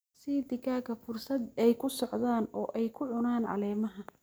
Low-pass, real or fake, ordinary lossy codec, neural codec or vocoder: none; real; none; none